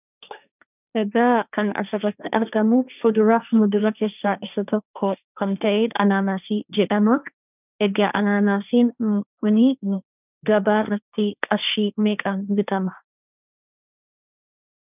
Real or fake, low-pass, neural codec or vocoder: fake; 3.6 kHz; codec, 16 kHz, 1.1 kbps, Voila-Tokenizer